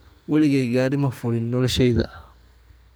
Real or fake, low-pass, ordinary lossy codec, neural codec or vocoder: fake; none; none; codec, 44.1 kHz, 2.6 kbps, SNAC